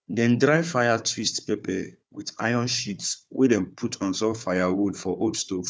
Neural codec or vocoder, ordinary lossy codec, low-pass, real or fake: codec, 16 kHz, 4 kbps, FunCodec, trained on Chinese and English, 50 frames a second; none; none; fake